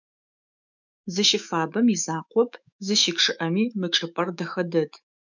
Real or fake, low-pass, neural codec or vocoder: fake; 7.2 kHz; autoencoder, 48 kHz, 128 numbers a frame, DAC-VAE, trained on Japanese speech